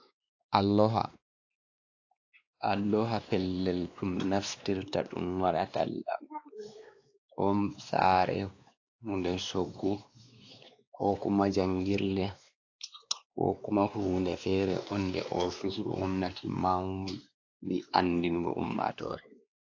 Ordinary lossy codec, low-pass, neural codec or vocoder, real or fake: AAC, 48 kbps; 7.2 kHz; codec, 16 kHz, 2 kbps, X-Codec, WavLM features, trained on Multilingual LibriSpeech; fake